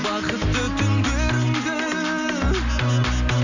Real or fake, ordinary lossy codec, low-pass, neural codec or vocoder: real; none; 7.2 kHz; none